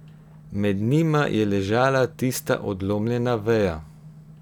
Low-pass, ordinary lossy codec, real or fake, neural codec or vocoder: 19.8 kHz; none; real; none